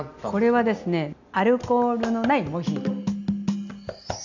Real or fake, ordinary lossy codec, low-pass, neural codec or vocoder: real; none; 7.2 kHz; none